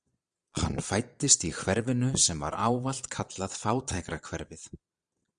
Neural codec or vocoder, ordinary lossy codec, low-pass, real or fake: vocoder, 22.05 kHz, 80 mel bands, Vocos; Opus, 64 kbps; 9.9 kHz; fake